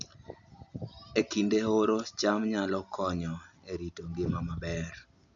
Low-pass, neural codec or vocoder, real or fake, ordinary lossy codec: 7.2 kHz; none; real; none